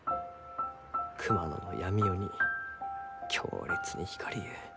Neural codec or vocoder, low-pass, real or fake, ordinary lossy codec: none; none; real; none